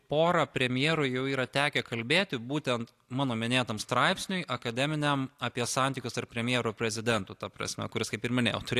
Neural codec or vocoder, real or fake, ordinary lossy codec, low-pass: none; real; AAC, 64 kbps; 14.4 kHz